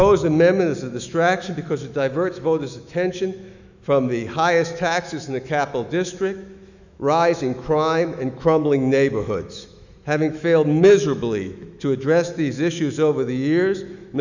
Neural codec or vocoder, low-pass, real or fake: autoencoder, 48 kHz, 128 numbers a frame, DAC-VAE, trained on Japanese speech; 7.2 kHz; fake